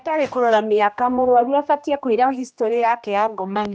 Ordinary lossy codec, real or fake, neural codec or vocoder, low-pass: none; fake; codec, 16 kHz, 1 kbps, X-Codec, HuBERT features, trained on general audio; none